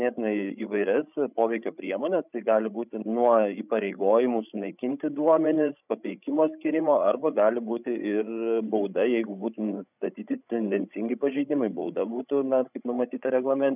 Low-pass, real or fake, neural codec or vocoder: 3.6 kHz; fake; codec, 16 kHz, 16 kbps, FreqCodec, larger model